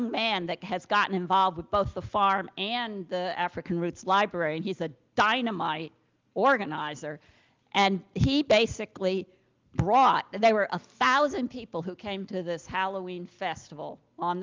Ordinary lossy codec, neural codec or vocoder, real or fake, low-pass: Opus, 32 kbps; none; real; 7.2 kHz